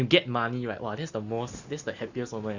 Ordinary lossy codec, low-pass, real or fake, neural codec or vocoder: Opus, 64 kbps; 7.2 kHz; real; none